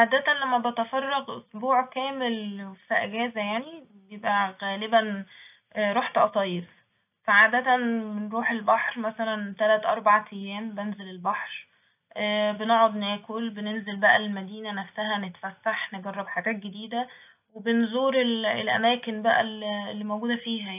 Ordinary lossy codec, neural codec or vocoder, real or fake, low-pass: none; none; real; 3.6 kHz